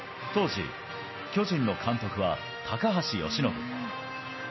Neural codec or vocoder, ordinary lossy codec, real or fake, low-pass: none; MP3, 24 kbps; real; 7.2 kHz